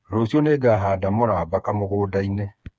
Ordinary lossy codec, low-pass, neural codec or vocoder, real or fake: none; none; codec, 16 kHz, 4 kbps, FreqCodec, smaller model; fake